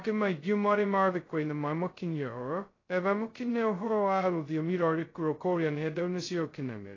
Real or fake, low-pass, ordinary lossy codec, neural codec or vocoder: fake; 7.2 kHz; AAC, 32 kbps; codec, 16 kHz, 0.2 kbps, FocalCodec